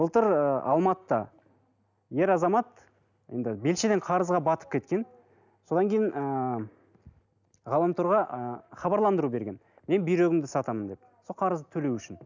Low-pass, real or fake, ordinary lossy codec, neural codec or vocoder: 7.2 kHz; real; none; none